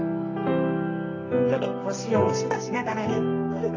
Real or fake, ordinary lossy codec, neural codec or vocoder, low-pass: fake; AAC, 32 kbps; codec, 24 kHz, 0.9 kbps, WavTokenizer, medium music audio release; 7.2 kHz